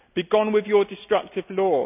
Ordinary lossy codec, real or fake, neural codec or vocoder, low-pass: none; real; none; 3.6 kHz